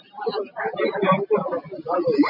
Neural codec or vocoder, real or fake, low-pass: none; real; 5.4 kHz